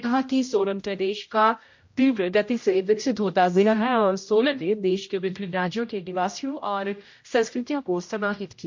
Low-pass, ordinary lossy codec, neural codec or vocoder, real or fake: 7.2 kHz; MP3, 48 kbps; codec, 16 kHz, 0.5 kbps, X-Codec, HuBERT features, trained on general audio; fake